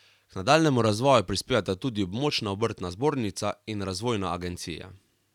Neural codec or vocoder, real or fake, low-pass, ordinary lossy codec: none; real; 19.8 kHz; none